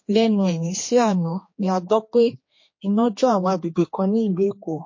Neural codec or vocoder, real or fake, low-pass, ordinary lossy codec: codec, 16 kHz, 2 kbps, X-Codec, HuBERT features, trained on general audio; fake; 7.2 kHz; MP3, 32 kbps